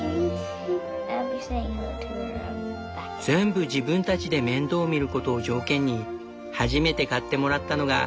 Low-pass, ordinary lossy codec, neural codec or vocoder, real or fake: none; none; none; real